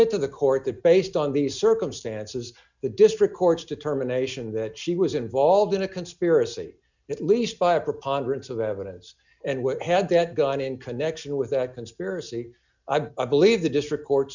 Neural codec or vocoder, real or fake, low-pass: none; real; 7.2 kHz